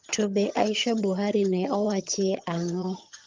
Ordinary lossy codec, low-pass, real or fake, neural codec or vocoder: Opus, 24 kbps; 7.2 kHz; fake; vocoder, 22.05 kHz, 80 mel bands, HiFi-GAN